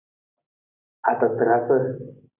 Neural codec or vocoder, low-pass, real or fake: none; 3.6 kHz; real